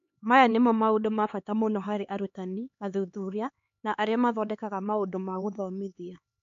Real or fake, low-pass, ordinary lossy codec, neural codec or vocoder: fake; 7.2 kHz; MP3, 48 kbps; codec, 16 kHz, 4 kbps, X-Codec, HuBERT features, trained on LibriSpeech